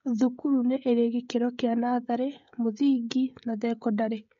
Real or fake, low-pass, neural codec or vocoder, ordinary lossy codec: fake; 7.2 kHz; codec, 16 kHz, 16 kbps, FreqCodec, smaller model; MP3, 48 kbps